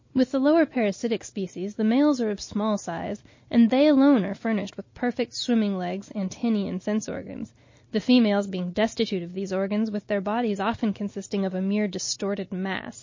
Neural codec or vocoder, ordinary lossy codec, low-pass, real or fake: none; MP3, 32 kbps; 7.2 kHz; real